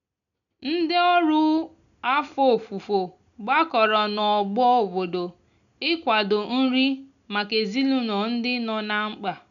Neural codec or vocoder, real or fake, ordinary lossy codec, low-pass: none; real; none; 7.2 kHz